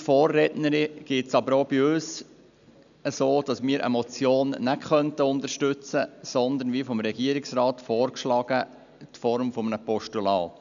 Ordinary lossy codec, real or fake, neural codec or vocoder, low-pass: none; real; none; 7.2 kHz